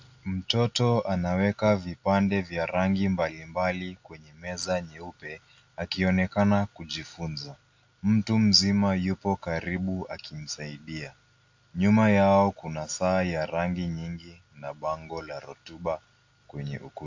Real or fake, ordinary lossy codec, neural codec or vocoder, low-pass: real; AAC, 48 kbps; none; 7.2 kHz